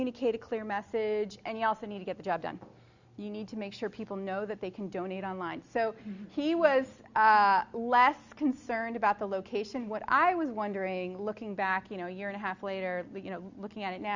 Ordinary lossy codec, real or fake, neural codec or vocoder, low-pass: Opus, 64 kbps; real; none; 7.2 kHz